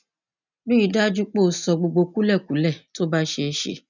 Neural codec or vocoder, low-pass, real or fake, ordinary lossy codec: none; 7.2 kHz; real; none